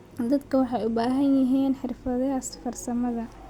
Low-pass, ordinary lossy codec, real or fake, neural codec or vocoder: 19.8 kHz; Opus, 64 kbps; real; none